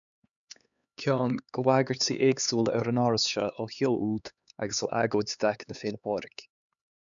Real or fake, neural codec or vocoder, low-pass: fake; codec, 16 kHz, 4 kbps, X-Codec, HuBERT features, trained on LibriSpeech; 7.2 kHz